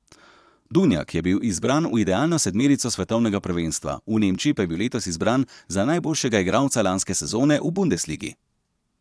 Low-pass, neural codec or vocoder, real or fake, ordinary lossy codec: none; vocoder, 22.05 kHz, 80 mel bands, Vocos; fake; none